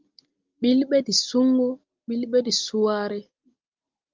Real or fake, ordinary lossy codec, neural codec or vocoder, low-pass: real; Opus, 24 kbps; none; 7.2 kHz